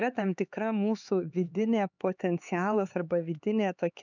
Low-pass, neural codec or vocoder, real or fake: 7.2 kHz; codec, 16 kHz, 4 kbps, X-Codec, HuBERT features, trained on LibriSpeech; fake